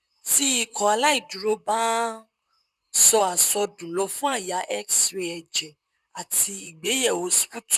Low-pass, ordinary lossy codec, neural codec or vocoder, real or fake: 14.4 kHz; none; vocoder, 44.1 kHz, 128 mel bands, Pupu-Vocoder; fake